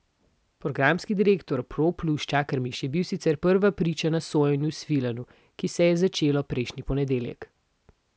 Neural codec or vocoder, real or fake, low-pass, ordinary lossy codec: none; real; none; none